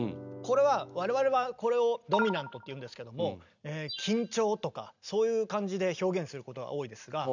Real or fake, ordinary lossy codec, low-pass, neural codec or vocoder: real; none; 7.2 kHz; none